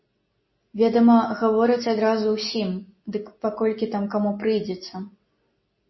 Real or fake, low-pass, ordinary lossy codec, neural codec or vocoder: real; 7.2 kHz; MP3, 24 kbps; none